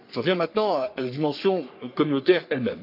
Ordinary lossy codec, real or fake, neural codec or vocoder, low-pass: none; fake; codec, 44.1 kHz, 3.4 kbps, Pupu-Codec; 5.4 kHz